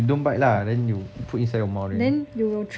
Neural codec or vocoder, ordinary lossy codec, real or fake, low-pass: none; none; real; none